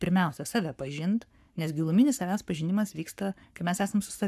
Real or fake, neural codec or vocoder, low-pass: fake; codec, 44.1 kHz, 7.8 kbps, Pupu-Codec; 14.4 kHz